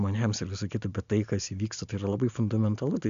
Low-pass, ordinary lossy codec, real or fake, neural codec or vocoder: 7.2 kHz; MP3, 64 kbps; real; none